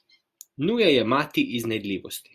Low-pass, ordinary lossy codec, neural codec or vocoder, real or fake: 14.4 kHz; Opus, 64 kbps; none; real